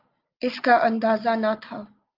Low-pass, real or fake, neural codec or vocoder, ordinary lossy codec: 5.4 kHz; real; none; Opus, 24 kbps